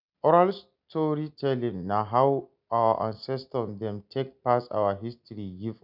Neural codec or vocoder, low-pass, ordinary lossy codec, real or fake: none; 5.4 kHz; none; real